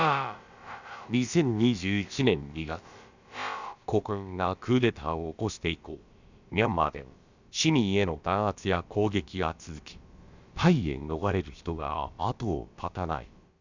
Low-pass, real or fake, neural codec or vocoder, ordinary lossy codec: 7.2 kHz; fake; codec, 16 kHz, about 1 kbps, DyCAST, with the encoder's durations; Opus, 64 kbps